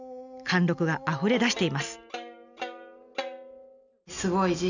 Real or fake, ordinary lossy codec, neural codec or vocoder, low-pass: real; none; none; 7.2 kHz